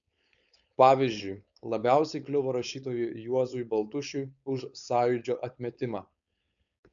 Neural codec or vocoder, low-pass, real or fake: codec, 16 kHz, 4.8 kbps, FACodec; 7.2 kHz; fake